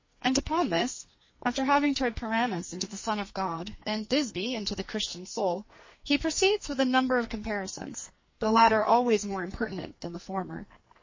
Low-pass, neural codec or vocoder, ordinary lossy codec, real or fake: 7.2 kHz; codec, 44.1 kHz, 2.6 kbps, SNAC; MP3, 32 kbps; fake